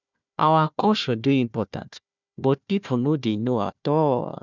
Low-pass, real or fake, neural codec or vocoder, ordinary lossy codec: 7.2 kHz; fake; codec, 16 kHz, 1 kbps, FunCodec, trained on Chinese and English, 50 frames a second; none